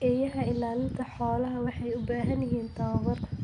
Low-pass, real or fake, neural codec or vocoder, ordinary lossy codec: 10.8 kHz; real; none; none